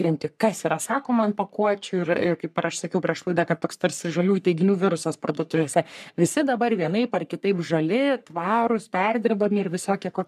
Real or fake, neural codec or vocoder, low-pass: fake; codec, 44.1 kHz, 3.4 kbps, Pupu-Codec; 14.4 kHz